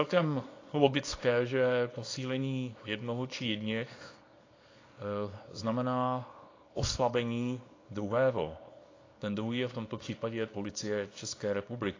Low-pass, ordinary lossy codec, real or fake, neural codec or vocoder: 7.2 kHz; AAC, 32 kbps; fake; codec, 24 kHz, 0.9 kbps, WavTokenizer, small release